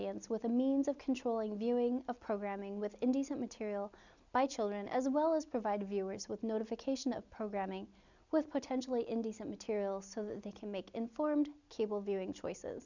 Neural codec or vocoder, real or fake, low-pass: none; real; 7.2 kHz